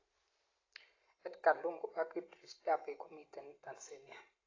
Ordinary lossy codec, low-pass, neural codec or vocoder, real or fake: AAC, 32 kbps; 7.2 kHz; none; real